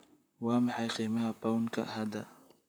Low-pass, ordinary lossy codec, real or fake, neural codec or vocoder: none; none; fake; vocoder, 44.1 kHz, 128 mel bands, Pupu-Vocoder